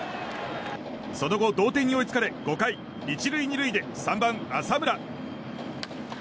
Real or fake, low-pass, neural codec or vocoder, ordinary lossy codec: real; none; none; none